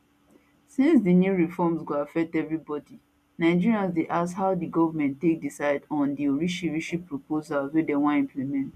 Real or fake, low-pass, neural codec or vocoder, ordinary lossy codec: real; 14.4 kHz; none; none